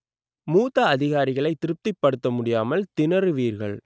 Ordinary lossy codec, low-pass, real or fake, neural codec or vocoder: none; none; real; none